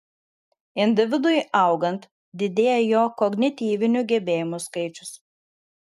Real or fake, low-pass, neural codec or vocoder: real; 14.4 kHz; none